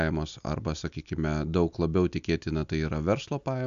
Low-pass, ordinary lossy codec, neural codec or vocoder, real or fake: 7.2 kHz; AAC, 96 kbps; none; real